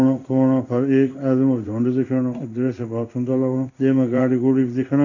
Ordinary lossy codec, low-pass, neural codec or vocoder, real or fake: none; 7.2 kHz; codec, 16 kHz in and 24 kHz out, 1 kbps, XY-Tokenizer; fake